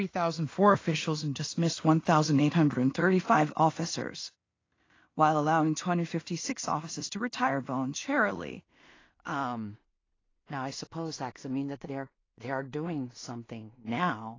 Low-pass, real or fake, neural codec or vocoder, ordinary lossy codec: 7.2 kHz; fake; codec, 16 kHz in and 24 kHz out, 0.4 kbps, LongCat-Audio-Codec, two codebook decoder; AAC, 32 kbps